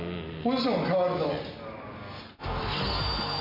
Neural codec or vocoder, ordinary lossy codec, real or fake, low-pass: none; MP3, 32 kbps; real; 5.4 kHz